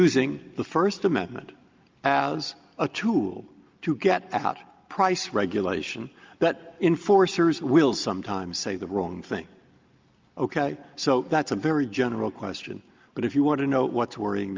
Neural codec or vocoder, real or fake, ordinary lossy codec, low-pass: none; real; Opus, 24 kbps; 7.2 kHz